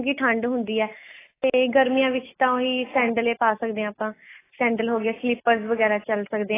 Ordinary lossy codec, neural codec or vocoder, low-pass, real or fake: AAC, 16 kbps; none; 3.6 kHz; real